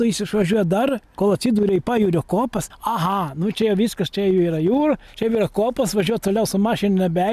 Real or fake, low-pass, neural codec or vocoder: real; 14.4 kHz; none